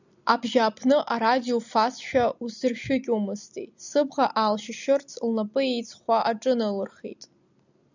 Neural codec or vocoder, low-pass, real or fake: none; 7.2 kHz; real